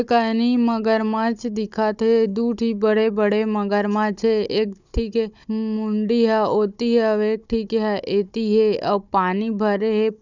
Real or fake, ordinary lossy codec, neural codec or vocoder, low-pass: fake; none; codec, 16 kHz, 16 kbps, FunCodec, trained on Chinese and English, 50 frames a second; 7.2 kHz